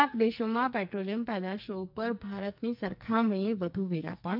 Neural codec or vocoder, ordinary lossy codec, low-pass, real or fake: codec, 44.1 kHz, 2.6 kbps, SNAC; none; 5.4 kHz; fake